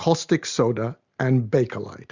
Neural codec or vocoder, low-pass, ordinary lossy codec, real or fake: none; 7.2 kHz; Opus, 64 kbps; real